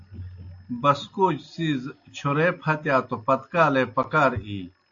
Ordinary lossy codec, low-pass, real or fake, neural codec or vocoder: AAC, 48 kbps; 7.2 kHz; real; none